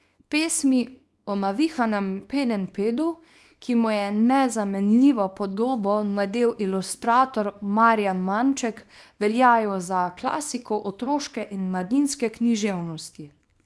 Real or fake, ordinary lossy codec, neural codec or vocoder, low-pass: fake; none; codec, 24 kHz, 0.9 kbps, WavTokenizer, small release; none